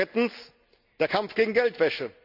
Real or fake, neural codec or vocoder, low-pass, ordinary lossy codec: real; none; 5.4 kHz; none